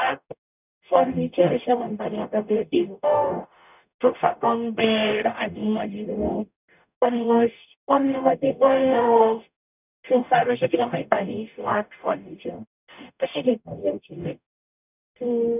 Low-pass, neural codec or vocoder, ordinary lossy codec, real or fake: 3.6 kHz; codec, 44.1 kHz, 0.9 kbps, DAC; none; fake